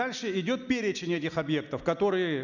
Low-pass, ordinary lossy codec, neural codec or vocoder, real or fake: 7.2 kHz; none; none; real